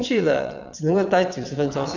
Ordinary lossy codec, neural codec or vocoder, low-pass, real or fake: none; vocoder, 22.05 kHz, 80 mel bands, WaveNeXt; 7.2 kHz; fake